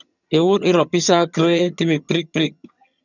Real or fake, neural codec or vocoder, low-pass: fake; vocoder, 22.05 kHz, 80 mel bands, HiFi-GAN; 7.2 kHz